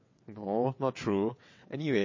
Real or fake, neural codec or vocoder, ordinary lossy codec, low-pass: fake; vocoder, 22.05 kHz, 80 mel bands, WaveNeXt; MP3, 32 kbps; 7.2 kHz